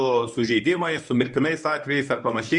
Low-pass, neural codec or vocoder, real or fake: 10.8 kHz; codec, 24 kHz, 0.9 kbps, WavTokenizer, medium speech release version 1; fake